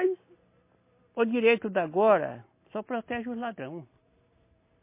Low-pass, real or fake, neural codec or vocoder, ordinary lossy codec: 3.6 kHz; real; none; MP3, 24 kbps